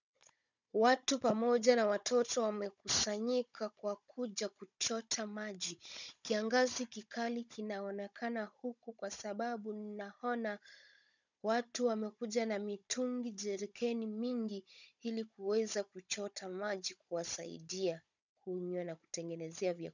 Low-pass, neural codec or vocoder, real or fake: 7.2 kHz; codec, 16 kHz, 16 kbps, FunCodec, trained on Chinese and English, 50 frames a second; fake